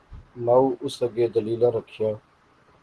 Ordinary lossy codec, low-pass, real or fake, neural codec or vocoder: Opus, 16 kbps; 10.8 kHz; real; none